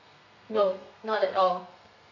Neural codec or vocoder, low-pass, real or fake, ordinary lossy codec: codec, 32 kHz, 1.9 kbps, SNAC; 7.2 kHz; fake; none